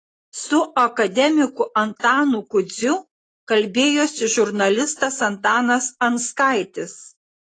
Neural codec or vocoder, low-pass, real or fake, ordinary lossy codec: none; 9.9 kHz; real; AAC, 32 kbps